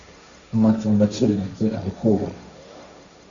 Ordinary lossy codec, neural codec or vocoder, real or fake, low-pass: Opus, 64 kbps; codec, 16 kHz, 1.1 kbps, Voila-Tokenizer; fake; 7.2 kHz